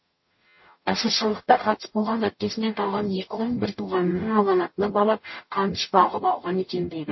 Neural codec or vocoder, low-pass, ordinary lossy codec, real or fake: codec, 44.1 kHz, 0.9 kbps, DAC; 7.2 kHz; MP3, 24 kbps; fake